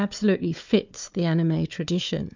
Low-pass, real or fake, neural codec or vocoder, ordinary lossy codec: 7.2 kHz; fake; codec, 16 kHz, 4 kbps, FunCodec, trained on Chinese and English, 50 frames a second; MP3, 64 kbps